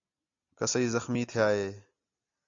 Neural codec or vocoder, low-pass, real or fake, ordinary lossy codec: none; 7.2 kHz; real; AAC, 64 kbps